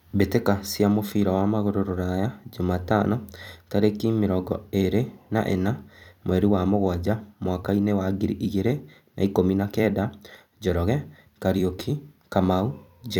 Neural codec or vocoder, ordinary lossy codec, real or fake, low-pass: vocoder, 44.1 kHz, 128 mel bands every 256 samples, BigVGAN v2; none; fake; 19.8 kHz